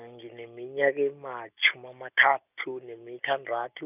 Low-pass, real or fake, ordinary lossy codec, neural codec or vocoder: 3.6 kHz; real; none; none